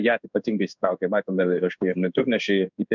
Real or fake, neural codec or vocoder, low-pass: fake; codec, 16 kHz in and 24 kHz out, 1 kbps, XY-Tokenizer; 7.2 kHz